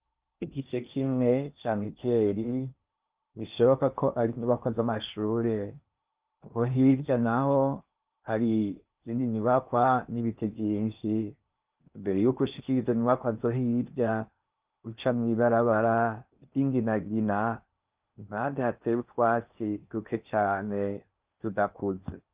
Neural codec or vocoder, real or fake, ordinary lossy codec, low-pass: codec, 16 kHz in and 24 kHz out, 0.8 kbps, FocalCodec, streaming, 65536 codes; fake; Opus, 24 kbps; 3.6 kHz